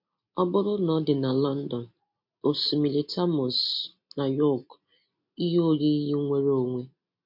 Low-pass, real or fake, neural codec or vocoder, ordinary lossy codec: 5.4 kHz; real; none; MP3, 32 kbps